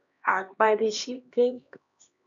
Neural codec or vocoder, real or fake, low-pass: codec, 16 kHz, 1 kbps, X-Codec, HuBERT features, trained on LibriSpeech; fake; 7.2 kHz